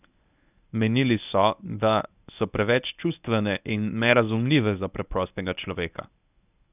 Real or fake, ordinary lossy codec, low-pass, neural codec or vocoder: fake; none; 3.6 kHz; codec, 24 kHz, 0.9 kbps, WavTokenizer, medium speech release version 1